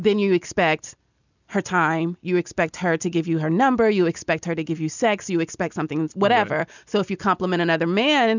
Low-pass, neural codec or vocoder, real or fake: 7.2 kHz; none; real